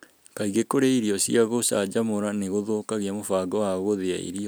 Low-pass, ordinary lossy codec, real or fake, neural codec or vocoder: none; none; real; none